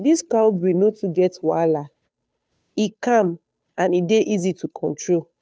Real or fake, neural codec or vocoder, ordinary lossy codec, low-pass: fake; codec, 16 kHz, 2 kbps, FunCodec, trained on Chinese and English, 25 frames a second; none; none